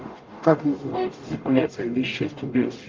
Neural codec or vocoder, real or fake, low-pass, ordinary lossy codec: codec, 44.1 kHz, 0.9 kbps, DAC; fake; 7.2 kHz; Opus, 24 kbps